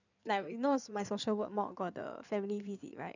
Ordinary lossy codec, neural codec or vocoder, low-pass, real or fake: none; codec, 16 kHz in and 24 kHz out, 2.2 kbps, FireRedTTS-2 codec; 7.2 kHz; fake